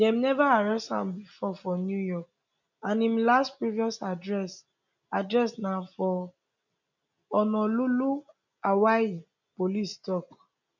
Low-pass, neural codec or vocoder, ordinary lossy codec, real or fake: 7.2 kHz; none; none; real